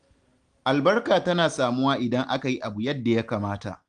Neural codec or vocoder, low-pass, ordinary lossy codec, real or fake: none; 9.9 kHz; Opus, 24 kbps; real